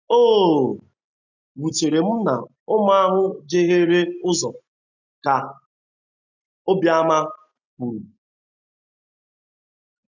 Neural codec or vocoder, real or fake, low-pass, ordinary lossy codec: none; real; 7.2 kHz; none